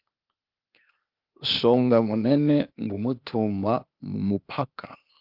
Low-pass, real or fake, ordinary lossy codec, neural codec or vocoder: 5.4 kHz; fake; Opus, 32 kbps; codec, 16 kHz, 0.8 kbps, ZipCodec